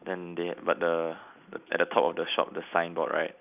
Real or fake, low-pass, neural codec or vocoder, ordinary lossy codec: real; 3.6 kHz; none; none